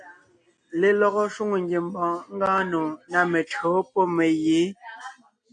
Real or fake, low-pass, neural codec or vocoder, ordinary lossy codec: real; 9.9 kHz; none; AAC, 48 kbps